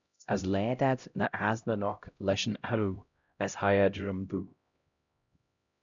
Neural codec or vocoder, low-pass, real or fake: codec, 16 kHz, 0.5 kbps, X-Codec, HuBERT features, trained on LibriSpeech; 7.2 kHz; fake